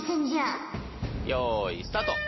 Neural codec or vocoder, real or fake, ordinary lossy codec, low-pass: none; real; MP3, 24 kbps; 7.2 kHz